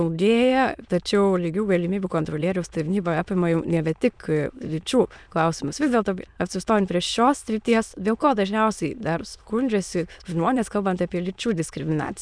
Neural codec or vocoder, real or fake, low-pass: autoencoder, 22.05 kHz, a latent of 192 numbers a frame, VITS, trained on many speakers; fake; 9.9 kHz